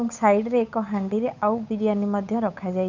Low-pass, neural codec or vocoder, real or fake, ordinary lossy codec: 7.2 kHz; codec, 16 kHz, 8 kbps, FunCodec, trained on Chinese and English, 25 frames a second; fake; none